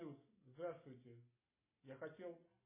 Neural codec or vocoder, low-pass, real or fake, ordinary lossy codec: none; 3.6 kHz; real; MP3, 16 kbps